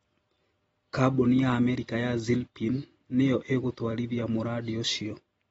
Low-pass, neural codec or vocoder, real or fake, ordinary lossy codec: 19.8 kHz; none; real; AAC, 24 kbps